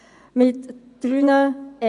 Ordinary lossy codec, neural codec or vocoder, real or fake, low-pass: none; vocoder, 24 kHz, 100 mel bands, Vocos; fake; 10.8 kHz